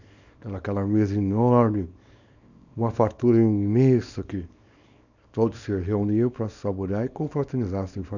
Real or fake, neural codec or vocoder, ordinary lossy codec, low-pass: fake; codec, 24 kHz, 0.9 kbps, WavTokenizer, small release; none; 7.2 kHz